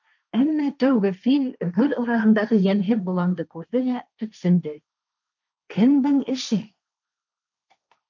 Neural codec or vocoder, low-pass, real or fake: codec, 16 kHz, 1.1 kbps, Voila-Tokenizer; 7.2 kHz; fake